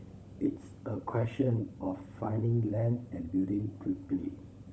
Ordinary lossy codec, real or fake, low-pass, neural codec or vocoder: none; fake; none; codec, 16 kHz, 16 kbps, FunCodec, trained on LibriTTS, 50 frames a second